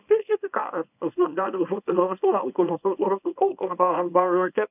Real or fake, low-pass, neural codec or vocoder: fake; 3.6 kHz; codec, 24 kHz, 0.9 kbps, WavTokenizer, small release